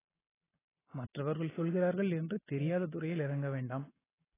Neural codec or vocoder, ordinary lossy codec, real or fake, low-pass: none; AAC, 16 kbps; real; 3.6 kHz